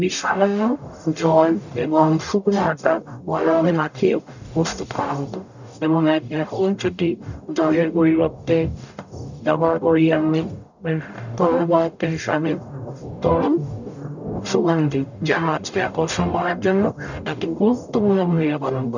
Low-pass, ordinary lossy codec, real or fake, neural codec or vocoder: 7.2 kHz; none; fake; codec, 44.1 kHz, 0.9 kbps, DAC